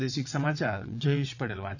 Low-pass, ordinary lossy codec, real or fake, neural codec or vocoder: 7.2 kHz; none; fake; vocoder, 22.05 kHz, 80 mel bands, WaveNeXt